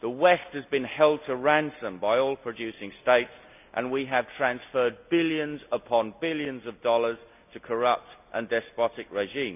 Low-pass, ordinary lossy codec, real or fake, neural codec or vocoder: 3.6 kHz; none; real; none